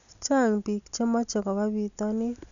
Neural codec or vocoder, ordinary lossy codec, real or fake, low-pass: none; none; real; 7.2 kHz